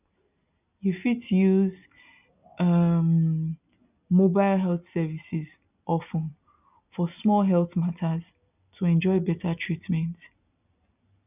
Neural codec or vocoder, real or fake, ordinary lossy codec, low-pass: none; real; none; 3.6 kHz